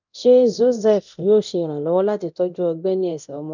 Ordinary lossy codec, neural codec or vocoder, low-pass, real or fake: none; codec, 24 kHz, 0.9 kbps, DualCodec; 7.2 kHz; fake